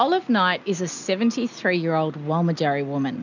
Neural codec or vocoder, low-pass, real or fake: none; 7.2 kHz; real